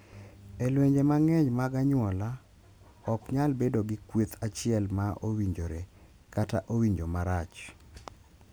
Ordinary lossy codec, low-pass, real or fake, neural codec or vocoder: none; none; real; none